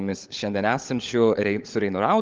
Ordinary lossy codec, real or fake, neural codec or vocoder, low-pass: Opus, 24 kbps; fake; codec, 16 kHz, 16 kbps, FunCodec, trained on LibriTTS, 50 frames a second; 7.2 kHz